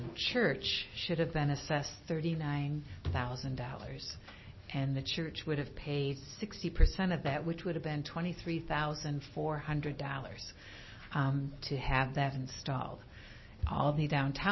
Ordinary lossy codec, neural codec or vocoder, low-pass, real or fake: MP3, 24 kbps; none; 7.2 kHz; real